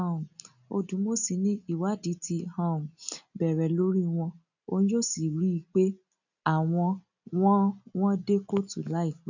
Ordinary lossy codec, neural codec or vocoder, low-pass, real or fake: none; none; 7.2 kHz; real